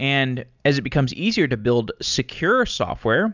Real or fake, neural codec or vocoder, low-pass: real; none; 7.2 kHz